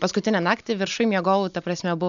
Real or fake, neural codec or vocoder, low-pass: real; none; 7.2 kHz